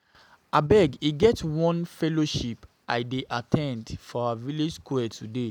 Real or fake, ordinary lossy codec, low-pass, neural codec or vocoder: real; none; 19.8 kHz; none